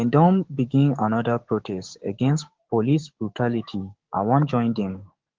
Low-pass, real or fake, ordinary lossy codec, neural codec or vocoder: 7.2 kHz; fake; Opus, 16 kbps; codec, 16 kHz, 6 kbps, DAC